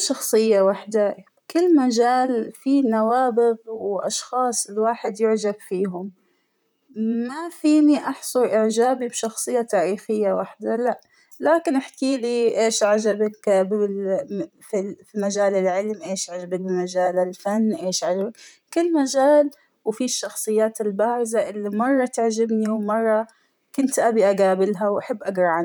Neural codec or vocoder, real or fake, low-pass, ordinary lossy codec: vocoder, 44.1 kHz, 128 mel bands, Pupu-Vocoder; fake; none; none